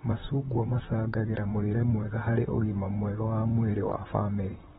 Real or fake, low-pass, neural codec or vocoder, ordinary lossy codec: fake; 19.8 kHz; vocoder, 44.1 kHz, 128 mel bands, Pupu-Vocoder; AAC, 16 kbps